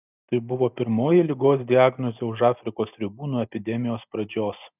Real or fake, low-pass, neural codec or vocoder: real; 3.6 kHz; none